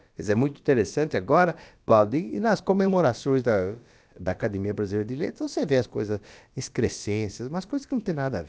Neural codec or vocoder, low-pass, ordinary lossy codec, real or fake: codec, 16 kHz, about 1 kbps, DyCAST, with the encoder's durations; none; none; fake